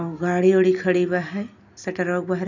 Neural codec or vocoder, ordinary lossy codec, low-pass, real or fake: none; none; 7.2 kHz; real